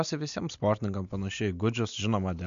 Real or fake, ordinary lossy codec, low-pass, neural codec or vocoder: real; MP3, 64 kbps; 7.2 kHz; none